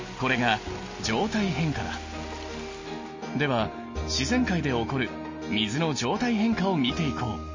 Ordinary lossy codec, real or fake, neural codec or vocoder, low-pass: MP3, 32 kbps; real; none; 7.2 kHz